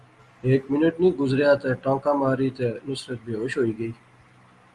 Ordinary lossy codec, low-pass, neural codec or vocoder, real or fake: Opus, 32 kbps; 10.8 kHz; none; real